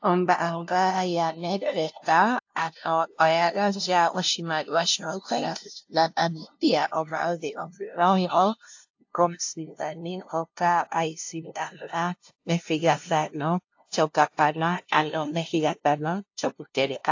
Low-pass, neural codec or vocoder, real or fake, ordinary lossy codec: 7.2 kHz; codec, 16 kHz, 0.5 kbps, FunCodec, trained on LibriTTS, 25 frames a second; fake; AAC, 48 kbps